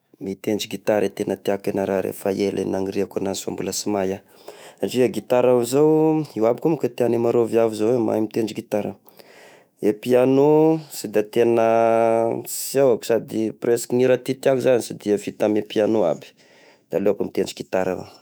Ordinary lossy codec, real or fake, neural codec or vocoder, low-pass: none; fake; autoencoder, 48 kHz, 128 numbers a frame, DAC-VAE, trained on Japanese speech; none